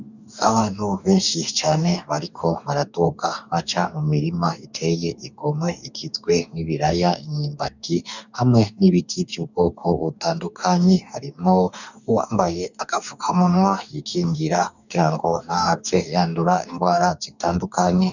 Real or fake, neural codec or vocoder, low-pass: fake; codec, 44.1 kHz, 2.6 kbps, DAC; 7.2 kHz